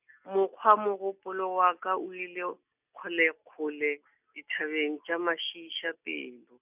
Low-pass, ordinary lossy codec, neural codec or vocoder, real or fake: 3.6 kHz; none; none; real